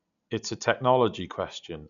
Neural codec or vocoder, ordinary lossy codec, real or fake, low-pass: none; none; real; 7.2 kHz